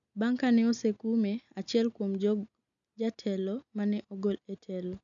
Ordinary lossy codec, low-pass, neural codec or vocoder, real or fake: none; 7.2 kHz; none; real